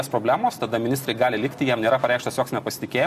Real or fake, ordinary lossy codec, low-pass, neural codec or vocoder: real; MP3, 96 kbps; 14.4 kHz; none